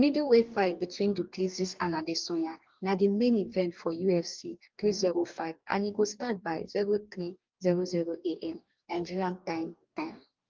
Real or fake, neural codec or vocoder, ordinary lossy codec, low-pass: fake; codec, 44.1 kHz, 2.6 kbps, DAC; Opus, 24 kbps; 7.2 kHz